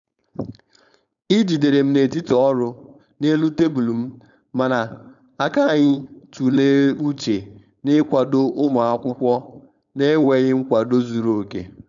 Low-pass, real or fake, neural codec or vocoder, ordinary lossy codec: 7.2 kHz; fake; codec, 16 kHz, 4.8 kbps, FACodec; none